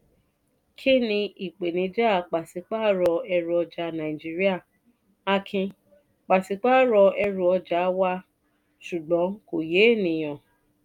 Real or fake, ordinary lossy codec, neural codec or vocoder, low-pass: real; none; none; 19.8 kHz